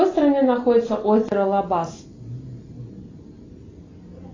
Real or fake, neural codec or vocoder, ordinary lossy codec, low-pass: real; none; AAC, 32 kbps; 7.2 kHz